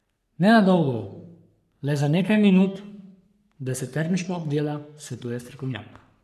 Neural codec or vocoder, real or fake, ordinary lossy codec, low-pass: codec, 44.1 kHz, 3.4 kbps, Pupu-Codec; fake; none; 14.4 kHz